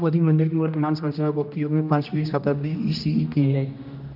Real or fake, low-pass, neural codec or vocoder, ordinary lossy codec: fake; 5.4 kHz; codec, 16 kHz, 1 kbps, X-Codec, HuBERT features, trained on general audio; none